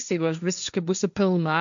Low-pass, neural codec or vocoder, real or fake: 7.2 kHz; codec, 16 kHz, 1.1 kbps, Voila-Tokenizer; fake